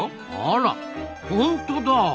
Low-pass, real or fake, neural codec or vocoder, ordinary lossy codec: none; real; none; none